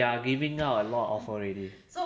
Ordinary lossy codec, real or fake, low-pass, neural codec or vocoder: none; real; none; none